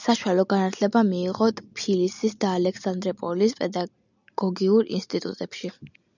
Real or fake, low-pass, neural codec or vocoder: real; 7.2 kHz; none